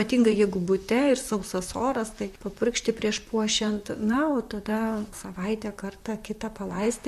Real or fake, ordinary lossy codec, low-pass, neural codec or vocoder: fake; MP3, 64 kbps; 14.4 kHz; vocoder, 44.1 kHz, 128 mel bands, Pupu-Vocoder